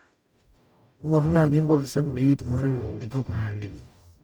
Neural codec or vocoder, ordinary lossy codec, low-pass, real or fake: codec, 44.1 kHz, 0.9 kbps, DAC; none; 19.8 kHz; fake